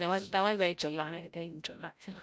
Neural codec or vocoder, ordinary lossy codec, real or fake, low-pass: codec, 16 kHz, 0.5 kbps, FreqCodec, larger model; none; fake; none